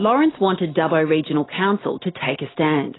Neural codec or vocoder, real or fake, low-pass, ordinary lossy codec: none; real; 7.2 kHz; AAC, 16 kbps